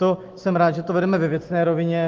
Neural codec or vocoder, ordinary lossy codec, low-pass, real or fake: none; Opus, 16 kbps; 7.2 kHz; real